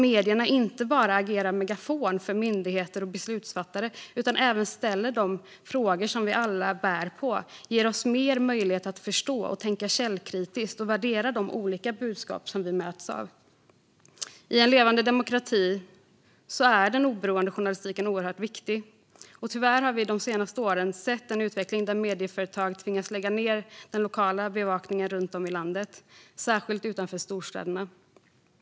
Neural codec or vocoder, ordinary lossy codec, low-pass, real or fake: none; none; none; real